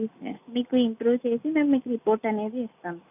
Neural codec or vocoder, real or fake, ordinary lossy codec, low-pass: none; real; none; 3.6 kHz